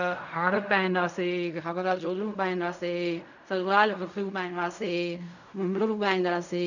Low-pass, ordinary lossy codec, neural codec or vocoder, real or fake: 7.2 kHz; none; codec, 16 kHz in and 24 kHz out, 0.4 kbps, LongCat-Audio-Codec, fine tuned four codebook decoder; fake